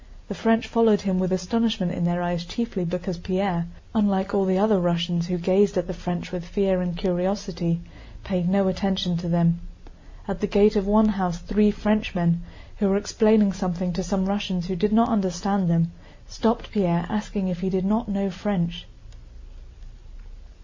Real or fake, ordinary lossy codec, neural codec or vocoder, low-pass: real; MP3, 48 kbps; none; 7.2 kHz